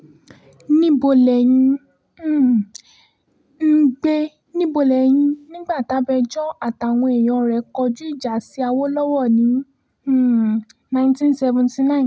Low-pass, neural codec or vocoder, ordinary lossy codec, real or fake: none; none; none; real